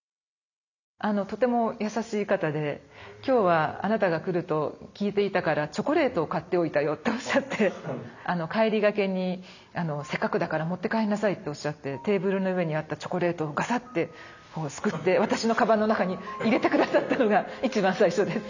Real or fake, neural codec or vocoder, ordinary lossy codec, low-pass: real; none; none; 7.2 kHz